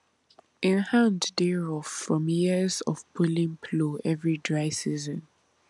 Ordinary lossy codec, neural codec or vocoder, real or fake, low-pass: none; none; real; 10.8 kHz